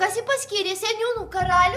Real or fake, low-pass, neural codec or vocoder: fake; 14.4 kHz; vocoder, 44.1 kHz, 128 mel bands every 256 samples, BigVGAN v2